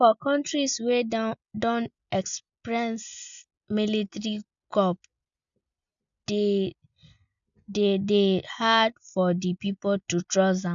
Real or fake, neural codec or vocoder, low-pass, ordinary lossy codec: real; none; 7.2 kHz; none